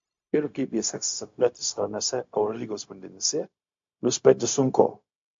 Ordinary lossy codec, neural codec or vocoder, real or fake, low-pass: MP3, 48 kbps; codec, 16 kHz, 0.4 kbps, LongCat-Audio-Codec; fake; 7.2 kHz